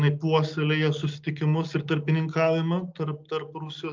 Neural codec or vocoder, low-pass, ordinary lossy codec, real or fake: none; 7.2 kHz; Opus, 24 kbps; real